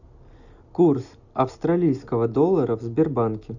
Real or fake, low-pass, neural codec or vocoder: real; 7.2 kHz; none